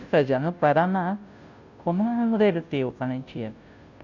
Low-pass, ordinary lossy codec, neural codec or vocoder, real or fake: 7.2 kHz; none; codec, 16 kHz, 0.5 kbps, FunCodec, trained on Chinese and English, 25 frames a second; fake